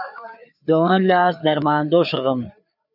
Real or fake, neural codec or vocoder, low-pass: fake; codec, 16 kHz, 4 kbps, FreqCodec, larger model; 5.4 kHz